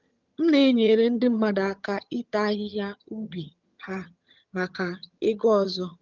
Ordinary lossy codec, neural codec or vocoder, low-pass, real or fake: Opus, 32 kbps; vocoder, 22.05 kHz, 80 mel bands, HiFi-GAN; 7.2 kHz; fake